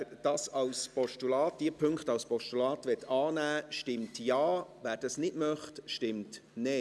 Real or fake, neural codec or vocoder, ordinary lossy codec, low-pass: real; none; none; none